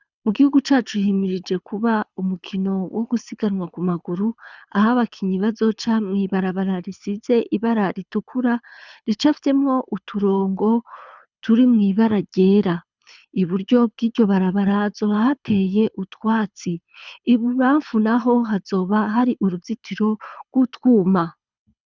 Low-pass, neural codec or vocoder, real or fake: 7.2 kHz; codec, 24 kHz, 6 kbps, HILCodec; fake